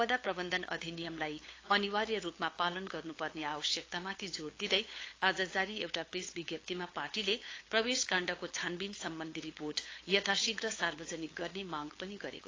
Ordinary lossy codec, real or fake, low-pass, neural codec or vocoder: AAC, 32 kbps; fake; 7.2 kHz; codec, 16 kHz, 8 kbps, FunCodec, trained on LibriTTS, 25 frames a second